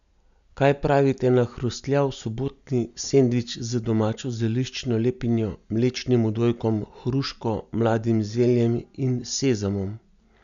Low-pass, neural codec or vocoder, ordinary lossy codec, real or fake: 7.2 kHz; none; none; real